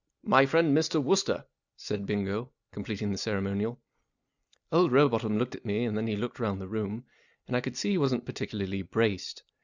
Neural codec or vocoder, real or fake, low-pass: none; real; 7.2 kHz